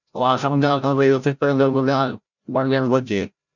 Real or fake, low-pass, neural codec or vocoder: fake; 7.2 kHz; codec, 16 kHz, 0.5 kbps, FreqCodec, larger model